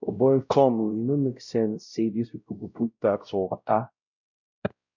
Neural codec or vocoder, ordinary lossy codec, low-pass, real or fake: codec, 16 kHz, 0.5 kbps, X-Codec, WavLM features, trained on Multilingual LibriSpeech; none; 7.2 kHz; fake